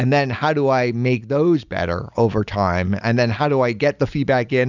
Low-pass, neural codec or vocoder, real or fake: 7.2 kHz; none; real